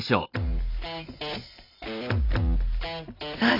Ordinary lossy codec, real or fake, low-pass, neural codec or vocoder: AAC, 32 kbps; real; 5.4 kHz; none